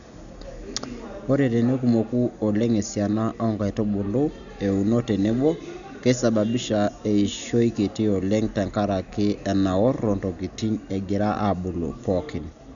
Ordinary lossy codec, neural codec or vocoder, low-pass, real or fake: none; none; 7.2 kHz; real